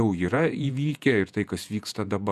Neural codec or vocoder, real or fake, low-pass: vocoder, 48 kHz, 128 mel bands, Vocos; fake; 14.4 kHz